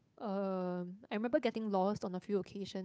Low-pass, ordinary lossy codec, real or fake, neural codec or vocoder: none; none; fake; codec, 16 kHz, 8 kbps, FunCodec, trained on Chinese and English, 25 frames a second